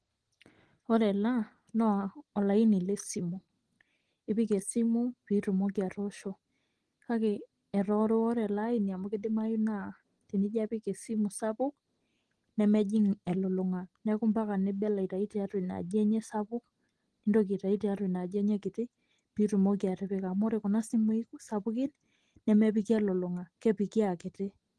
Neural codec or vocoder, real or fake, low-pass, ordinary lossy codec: none; real; 9.9 kHz; Opus, 16 kbps